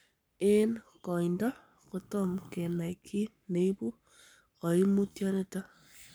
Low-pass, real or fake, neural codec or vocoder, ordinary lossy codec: none; fake; codec, 44.1 kHz, 7.8 kbps, Pupu-Codec; none